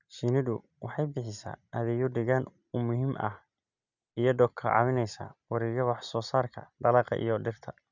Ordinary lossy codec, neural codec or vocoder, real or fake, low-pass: none; none; real; 7.2 kHz